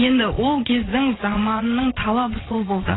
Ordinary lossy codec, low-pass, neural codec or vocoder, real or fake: AAC, 16 kbps; 7.2 kHz; vocoder, 22.05 kHz, 80 mel bands, Vocos; fake